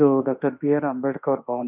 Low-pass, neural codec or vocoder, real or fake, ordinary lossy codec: 3.6 kHz; autoencoder, 48 kHz, 32 numbers a frame, DAC-VAE, trained on Japanese speech; fake; none